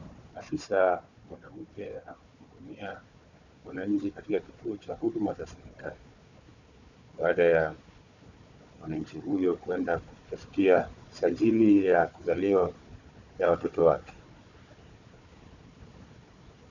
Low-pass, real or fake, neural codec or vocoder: 7.2 kHz; fake; codec, 16 kHz, 4 kbps, FunCodec, trained on Chinese and English, 50 frames a second